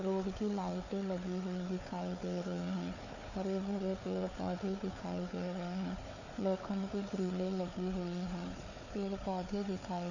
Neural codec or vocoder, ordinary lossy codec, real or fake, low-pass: codec, 16 kHz, 4 kbps, FunCodec, trained on Chinese and English, 50 frames a second; none; fake; 7.2 kHz